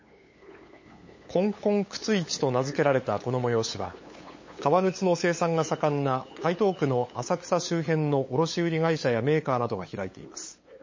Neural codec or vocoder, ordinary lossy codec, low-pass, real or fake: codec, 16 kHz, 8 kbps, FunCodec, trained on LibriTTS, 25 frames a second; MP3, 32 kbps; 7.2 kHz; fake